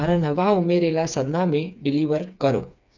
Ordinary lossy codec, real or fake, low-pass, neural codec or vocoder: none; fake; 7.2 kHz; codec, 16 kHz, 4 kbps, FreqCodec, smaller model